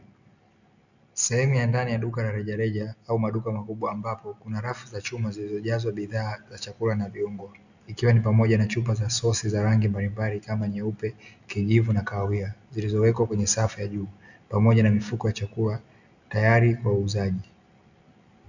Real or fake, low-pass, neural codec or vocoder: real; 7.2 kHz; none